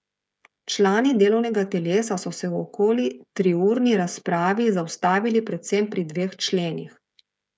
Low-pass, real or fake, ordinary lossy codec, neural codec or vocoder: none; fake; none; codec, 16 kHz, 16 kbps, FreqCodec, smaller model